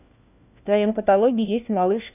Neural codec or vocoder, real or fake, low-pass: codec, 16 kHz, 1 kbps, FunCodec, trained on LibriTTS, 50 frames a second; fake; 3.6 kHz